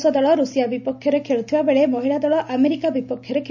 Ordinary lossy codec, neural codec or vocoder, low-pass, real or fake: none; none; 7.2 kHz; real